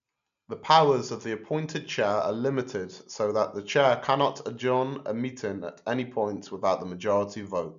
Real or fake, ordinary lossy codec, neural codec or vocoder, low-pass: real; none; none; 7.2 kHz